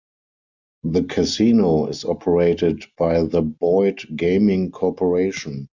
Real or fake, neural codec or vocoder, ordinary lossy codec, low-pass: real; none; MP3, 64 kbps; 7.2 kHz